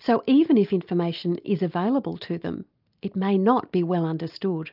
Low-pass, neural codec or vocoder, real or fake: 5.4 kHz; none; real